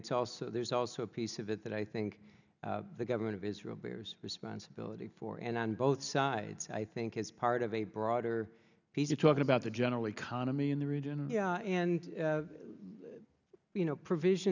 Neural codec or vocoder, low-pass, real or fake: none; 7.2 kHz; real